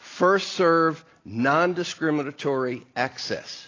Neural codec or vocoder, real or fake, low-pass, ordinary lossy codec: none; real; 7.2 kHz; AAC, 32 kbps